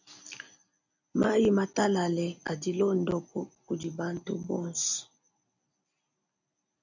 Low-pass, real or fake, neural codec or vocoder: 7.2 kHz; real; none